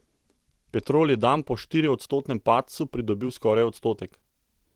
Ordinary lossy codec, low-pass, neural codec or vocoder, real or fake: Opus, 16 kbps; 19.8 kHz; vocoder, 44.1 kHz, 128 mel bands every 512 samples, BigVGAN v2; fake